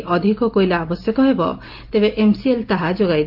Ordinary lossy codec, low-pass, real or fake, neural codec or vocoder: Opus, 24 kbps; 5.4 kHz; real; none